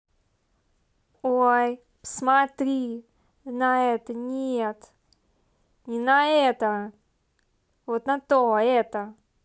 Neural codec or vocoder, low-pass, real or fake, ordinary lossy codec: none; none; real; none